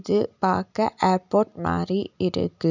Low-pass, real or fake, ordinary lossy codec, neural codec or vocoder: 7.2 kHz; real; none; none